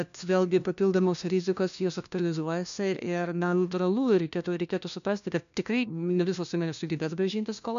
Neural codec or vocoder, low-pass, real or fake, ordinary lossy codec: codec, 16 kHz, 1 kbps, FunCodec, trained on LibriTTS, 50 frames a second; 7.2 kHz; fake; MP3, 96 kbps